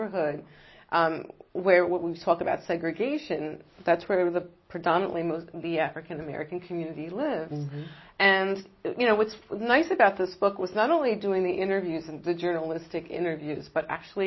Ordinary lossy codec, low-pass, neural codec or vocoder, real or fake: MP3, 24 kbps; 7.2 kHz; none; real